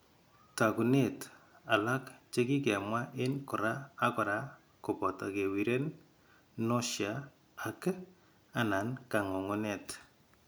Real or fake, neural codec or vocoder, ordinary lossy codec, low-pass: real; none; none; none